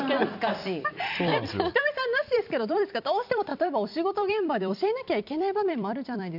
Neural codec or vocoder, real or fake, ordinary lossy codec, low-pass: vocoder, 44.1 kHz, 128 mel bands every 256 samples, BigVGAN v2; fake; none; 5.4 kHz